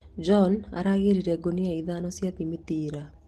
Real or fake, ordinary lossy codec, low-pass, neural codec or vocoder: real; Opus, 16 kbps; 14.4 kHz; none